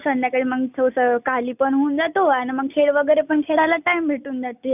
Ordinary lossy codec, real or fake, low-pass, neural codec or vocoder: none; real; 3.6 kHz; none